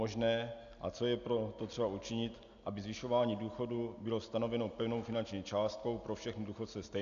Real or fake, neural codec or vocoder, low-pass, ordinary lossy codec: real; none; 7.2 kHz; AAC, 96 kbps